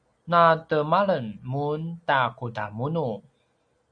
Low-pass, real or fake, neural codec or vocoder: 9.9 kHz; real; none